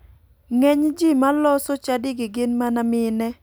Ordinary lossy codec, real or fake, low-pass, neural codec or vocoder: none; real; none; none